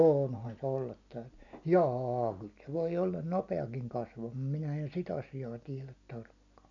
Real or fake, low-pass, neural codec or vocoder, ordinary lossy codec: real; 7.2 kHz; none; none